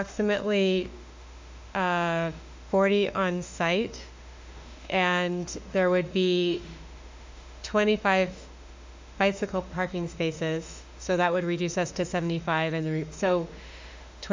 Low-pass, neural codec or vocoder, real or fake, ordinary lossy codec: 7.2 kHz; autoencoder, 48 kHz, 32 numbers a frame, DAC-VAE, trained on Japanese speech; fake; MP3, 64 kbps